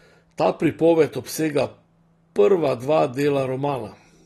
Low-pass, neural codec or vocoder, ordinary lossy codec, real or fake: 19.8 kHz; none; AAC, 32 kbps; real